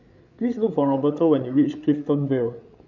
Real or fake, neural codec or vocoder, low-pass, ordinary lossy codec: fake; codec, 16 kHz, 8 kbps, FreqCodec, larger model; 7.2 kHz; none